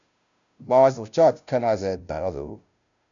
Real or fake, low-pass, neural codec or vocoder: fake; 7.2 kHz; codec, 16 kHz, 0.5 kbps, FunCodec, trained on Chinese and English, 25 frames a second